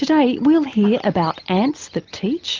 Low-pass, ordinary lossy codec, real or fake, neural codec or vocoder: 7.2 kHz; Opus, 32 kbps; real; none